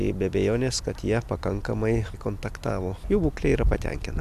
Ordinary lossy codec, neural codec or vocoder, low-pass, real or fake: MP3, 96 kbps; none; 14.4 kHz; real